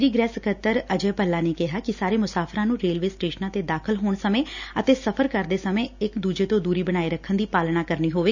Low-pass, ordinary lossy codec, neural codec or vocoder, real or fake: 7.2 kHz; none; none; real